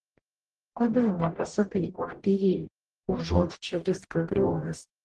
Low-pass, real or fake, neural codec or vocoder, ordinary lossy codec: 10.8 kHz; fake; codec, 44.1 kHz, 0.9 kbps, DAC; Opus, 16 kbps